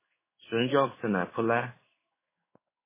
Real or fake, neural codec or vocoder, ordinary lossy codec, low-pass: fake; codec, 16 kHz in and 24 kHz out, 1 kbps, XY-Tokenizer; MP3, 16 kbps; 3.6 kHz